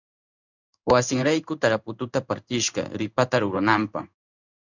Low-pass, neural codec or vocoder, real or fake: 7.2 kHz; codec, 16 kHz in and 24 kHz out, 1 kbps, XY-Tokenizer; fake